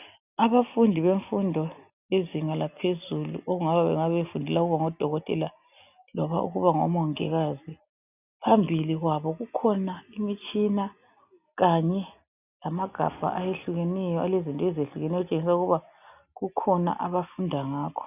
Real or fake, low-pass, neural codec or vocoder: real; 3.6 kHz; none